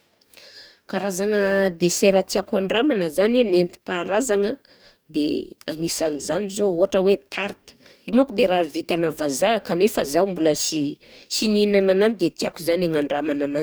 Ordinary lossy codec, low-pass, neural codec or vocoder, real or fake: none; none; codec, 44.1 kHz, 2.6 kbps, DAC; fake